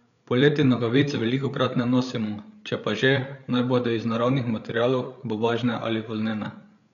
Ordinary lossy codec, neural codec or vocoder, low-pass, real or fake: none; codec, 16 kHz, 8 kbps, FreqCodec, larger model; 7.2 kHz; fake